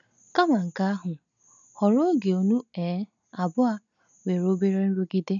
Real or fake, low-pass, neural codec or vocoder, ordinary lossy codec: real; 7.2 kHz; none; none